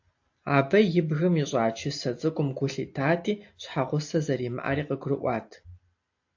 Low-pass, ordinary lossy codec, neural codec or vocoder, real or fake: 7.2 kHz; AAC, 48 kbps; none; real